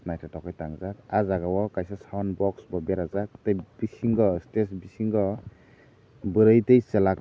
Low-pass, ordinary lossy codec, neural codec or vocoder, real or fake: none; none; none; real